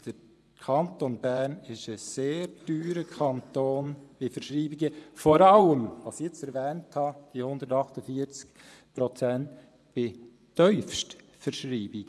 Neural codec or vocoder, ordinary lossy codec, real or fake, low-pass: vocoder, 24 kHz, 100 mel bands, Vocos; none; fake; none